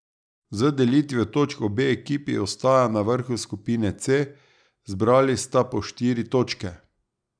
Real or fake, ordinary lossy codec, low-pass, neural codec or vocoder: real; none; 9.9 kHz; none